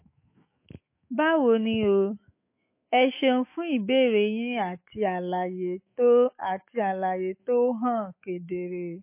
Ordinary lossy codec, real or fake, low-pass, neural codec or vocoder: MP3, 24 kbps; fake; 3.6 kHz; autoencoder, 48 kHz, 128 numbers a frame, DAC-VAE, trained on Japanese speech